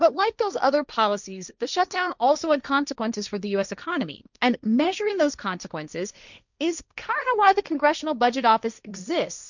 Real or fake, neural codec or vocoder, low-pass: fake; codec, 16 kHz, 1.1 kbps, Voila-Tokenizer; 7.2 kHz